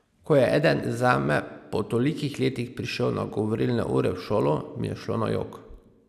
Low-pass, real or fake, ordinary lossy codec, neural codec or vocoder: 14.4 kHz; real; none; none